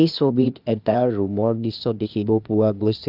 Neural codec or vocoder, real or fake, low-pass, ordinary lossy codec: codec, 16 kHz, 0.8 kbps, ZipCodec; fake; 5.4 kHz; Opus, 32 kbps